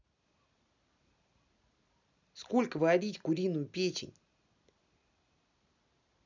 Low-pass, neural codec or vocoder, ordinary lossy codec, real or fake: 7.2 kHz; none; none; real